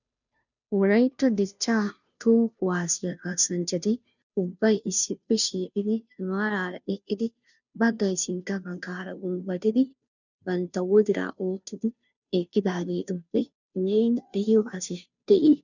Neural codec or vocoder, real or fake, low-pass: codec, 16 kHz, 0.5 kbps, FunCodec, trained on Chinese and English, 25 frames a second; fake; 7.2 kHz